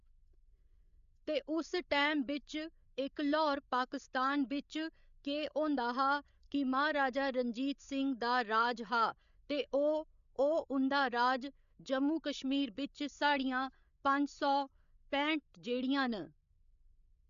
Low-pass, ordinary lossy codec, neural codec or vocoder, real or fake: 7.2 kHz; AAC, 96 kbps; codec, 16 kHz, 8 kbps, FreqCodec, larger model; fake